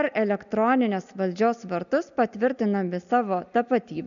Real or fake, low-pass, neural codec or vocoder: real; 7.2 kHz; none